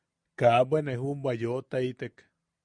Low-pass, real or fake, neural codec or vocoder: 9.9 kHz; real; none